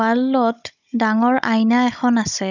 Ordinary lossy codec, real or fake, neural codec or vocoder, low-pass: none; fake; codec, 16 kHz, 16 kbps, FunCodec, trained on Chinese and English, 50 frames a second; 7.2 kHz